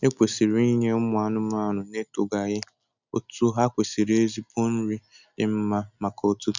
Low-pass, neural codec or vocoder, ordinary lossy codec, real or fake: 7.2 kHz; none; none; real